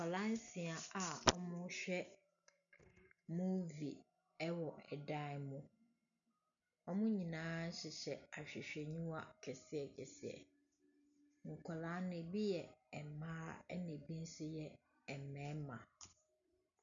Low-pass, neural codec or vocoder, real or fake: 7.2 kHz; none; real